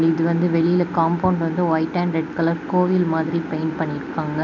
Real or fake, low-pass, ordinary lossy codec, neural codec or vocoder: real; 7.2 kHz; none; none